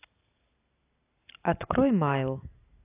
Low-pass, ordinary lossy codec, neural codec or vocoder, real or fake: 3.6 kHz; AAC, 24 kbps; none; real